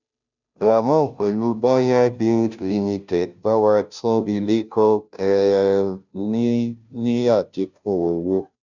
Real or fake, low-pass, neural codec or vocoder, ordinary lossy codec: fake; 7.2 kHz; codec, 16 kHz, 0.5 kbps, FunCodec, trained on Chinese and English, 25 frames a second; none